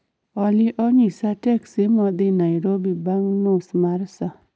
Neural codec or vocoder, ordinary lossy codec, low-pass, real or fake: none; none; none; real